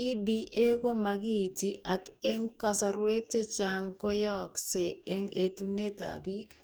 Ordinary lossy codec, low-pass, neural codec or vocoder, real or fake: none; none; codec, 44.1 kHz, 2.6 kbps, DAC; fake